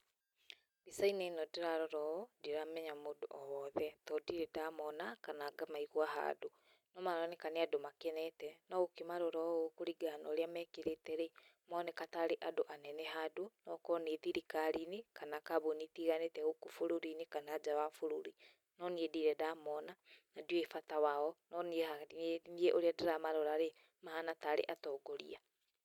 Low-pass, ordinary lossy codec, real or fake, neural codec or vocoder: 19.8 kHz; none; real; none